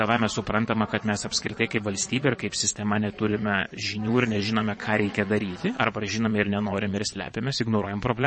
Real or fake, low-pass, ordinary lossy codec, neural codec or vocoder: fake; 9.9 kHz; MP3, 32 kbps; vocoder, 22.05 kHz, 80 mel bands, Vocos